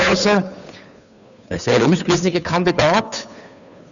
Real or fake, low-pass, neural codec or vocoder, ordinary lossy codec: fake; 7.2 kHz; codec, 16 kHz, 2 kbps, FunCodec, trained on Chinese and English, 25 frames a second; none